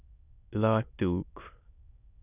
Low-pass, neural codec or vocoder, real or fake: 3.6 kHz; autoencoder, 22.05 kHz, a latent of 192 numbers a frame, VITS, trained on many speakers; fake